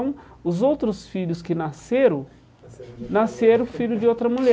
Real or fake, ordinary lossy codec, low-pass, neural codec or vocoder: real; none; none; none